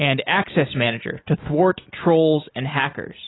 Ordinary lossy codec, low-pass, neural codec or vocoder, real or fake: AAC, 16 kbps; 7.2 kHz; none; real